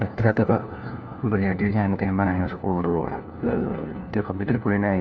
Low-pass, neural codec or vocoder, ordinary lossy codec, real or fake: none; codec, 16 kHz, 1 kbps, FunCodec, trained on LibriTTS, 50 frames a second; none; fake